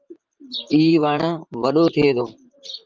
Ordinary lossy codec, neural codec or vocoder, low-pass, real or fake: Opus, 24 kbps; vocoder, 44.1 kHz, 128 mel bands, Pupu-Vocoder; 7.2 kHz; fake